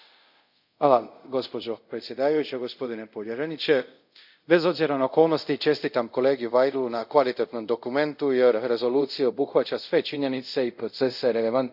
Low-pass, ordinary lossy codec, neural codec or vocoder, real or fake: 5.4 kHz; none; codec, 24 kHz, 0.5 kbps, DualCodec; fake